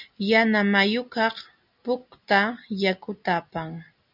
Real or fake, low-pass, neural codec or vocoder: real; 5.4 kHz; none